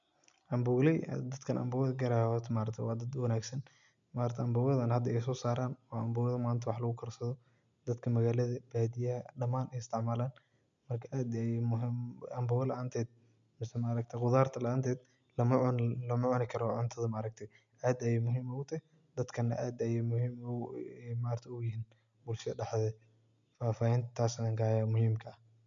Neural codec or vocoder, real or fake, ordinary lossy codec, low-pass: none; real; none; 7.2 kHz